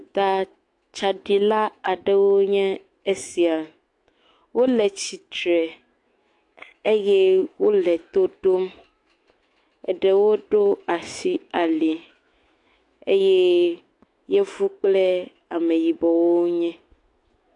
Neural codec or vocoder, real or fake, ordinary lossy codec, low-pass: autoencoder, 48 kHz, 128 numbers a frame, DAC-VAE, trained on Japanese speech; fake; AAC, 48 kbps; 10.8 kHz